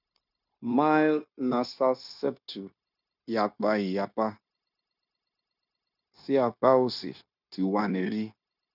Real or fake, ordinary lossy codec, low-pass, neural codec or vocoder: fake; none; 5.4 kHz; codec, 16 kHz, 0.9 kbps, LongCat-Audio-Codec